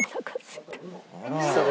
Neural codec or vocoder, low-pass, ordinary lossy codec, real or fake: none; none; none; real